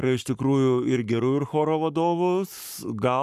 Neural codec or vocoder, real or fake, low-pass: codec, 44.1 kHz, 7.8 kbps, Pupu-Codec; fake; 14.4 kHz